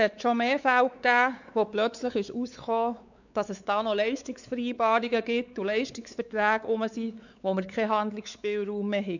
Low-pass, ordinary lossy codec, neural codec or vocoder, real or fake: 7.2 kHz; none; codec, 16 kHz, 4 kbps, X-Codec, WavLM features, trained on Multilingual LibriSpeech; fake